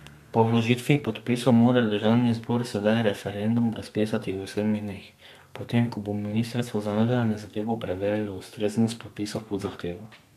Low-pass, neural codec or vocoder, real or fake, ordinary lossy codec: 14.4 kHz; codec, 32 kHz, 1.9 kbps, SNAC; fake; MP3, 96 kbps